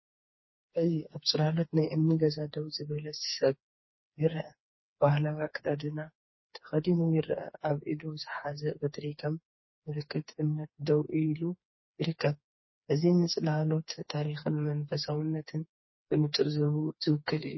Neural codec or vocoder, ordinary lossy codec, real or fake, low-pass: codec, 16 kHz, 4 kbps, FreqCodec, smaller model; MP3, 24 kbps; fake; 7.2 kHz